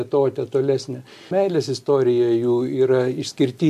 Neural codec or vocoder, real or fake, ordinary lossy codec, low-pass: none; real; MP3, 64 kbps; 14.4 kHz